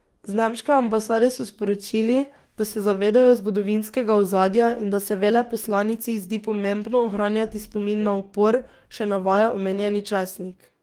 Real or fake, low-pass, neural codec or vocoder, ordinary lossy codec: fake; 19.8 kHz; codec, 44.1 kHz, 2.6 kbps, DAC; Opus, 32 kbps